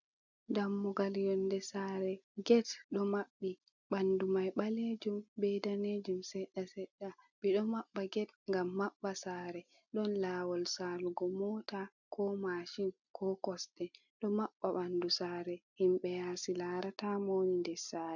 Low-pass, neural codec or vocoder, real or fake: 7.2 kHz; none; real